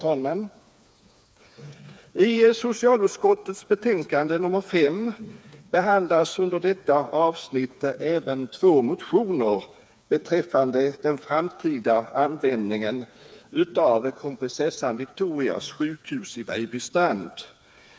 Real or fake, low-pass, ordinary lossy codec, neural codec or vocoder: fake; none; none; codec, 16 kHz, 4 kbps, FreqCodec, smaller model